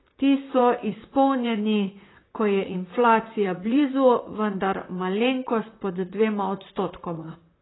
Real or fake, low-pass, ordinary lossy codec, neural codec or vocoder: fake; 7.2 kHz; AAC, 16 kbps; vocoder, 44.1 kHz, 128 mel bands, Pupu-Vocoder